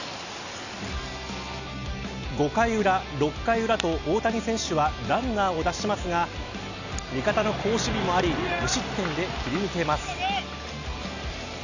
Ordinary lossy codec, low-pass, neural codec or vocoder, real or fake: none; 7.2 kHz; none; real